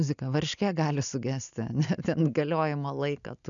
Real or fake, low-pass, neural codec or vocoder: real; 7.2 kHz; none